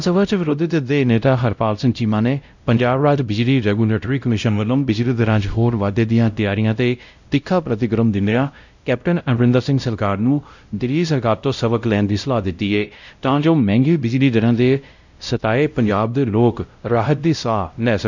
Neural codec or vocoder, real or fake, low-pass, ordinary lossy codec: codec, 16 kHz, 0.5 kbps, X-Codec, WavLM features, trained on Multilingual LibriSpeech; fake; 7.2 kHz; none